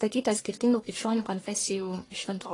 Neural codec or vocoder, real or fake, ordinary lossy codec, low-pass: codec, 44.1 kHz, 1.7 kbps, Pupu-Codec; fake; AAC, 32 kbps; 10.8 kHz